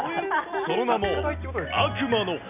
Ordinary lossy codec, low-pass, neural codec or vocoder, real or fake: none; 3.6 kHz; none; real